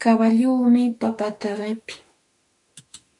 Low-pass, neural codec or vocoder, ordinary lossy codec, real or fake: 10.8 kHz; autoencoder, 48 kHz, 32 numbers a frame, DAC-VAE, trained on Japanese speech; MP3, 64 kbps; fake